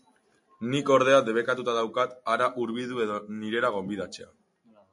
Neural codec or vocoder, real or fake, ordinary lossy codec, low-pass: none; real; MP3, 48 kbps; 10.8 kHz